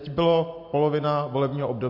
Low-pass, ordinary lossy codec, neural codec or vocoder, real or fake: 5.4 kHz; MP3, 32 kbps; none; real